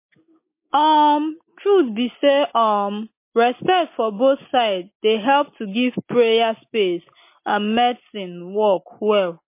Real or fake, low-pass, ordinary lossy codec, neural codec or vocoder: real; 3.6 kHz; MP3, 24 kbps; none